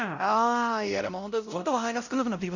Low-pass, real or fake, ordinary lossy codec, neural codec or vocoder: 7.2 kHz; fake; none; codec, 16 kHz, 0.5 kbps, X-Codec, WavLM features, trained on Multilingual LibriSpeech